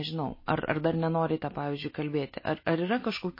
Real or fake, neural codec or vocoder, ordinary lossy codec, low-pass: real; none; MP3, 24 kbps; 5.4 kHz